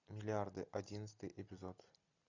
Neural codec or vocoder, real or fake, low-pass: none; real; 7.2 kHz